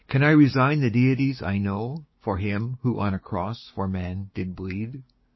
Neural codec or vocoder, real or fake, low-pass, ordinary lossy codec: codec, 16 kHz, 6 kbps, DAC; fake; 7.2 kHz; MP3, 24 kbps